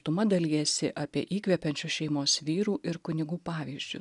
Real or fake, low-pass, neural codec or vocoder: real; 10.8 kHz; none